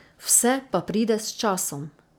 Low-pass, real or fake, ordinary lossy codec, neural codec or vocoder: none; real; none; none